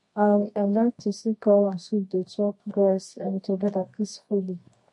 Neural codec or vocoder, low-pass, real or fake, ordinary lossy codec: codec, 24 kHz, 0.9 kbps, WavTokenizer, medium music audio release; 10.8 kHz; fake; MP3, 48 kbps